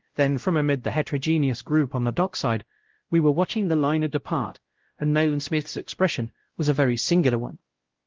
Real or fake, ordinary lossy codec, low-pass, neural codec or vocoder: fake; Opus, 16 kbps; 7.2 kHz; codec, 16 kHz, 0.5 kbps, X-Codec, WavLM features, trained on Multilingual LibriSpeech